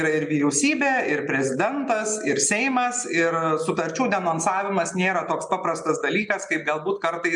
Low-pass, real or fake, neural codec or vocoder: 10.8 kHz; real; none